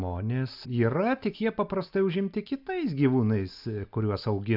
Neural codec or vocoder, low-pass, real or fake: none; 5.4 kHz; real